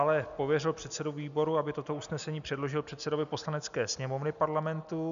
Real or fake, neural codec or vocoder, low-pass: real; none; 7.2 kHz